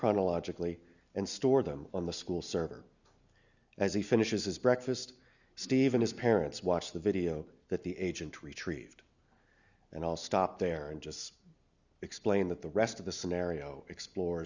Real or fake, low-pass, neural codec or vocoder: real; 7.2 kHz; none